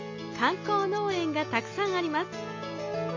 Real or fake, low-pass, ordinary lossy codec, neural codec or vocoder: real; 7.2 kHz; MP3, 64 kbps; none